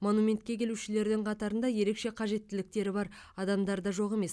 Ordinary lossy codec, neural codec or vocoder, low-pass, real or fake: none; none; none; real